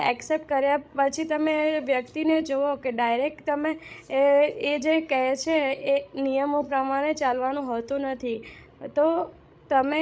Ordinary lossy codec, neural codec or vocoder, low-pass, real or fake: none; codec, 16 kHz, 16 kbps, FreqCodec, larger model; none; fake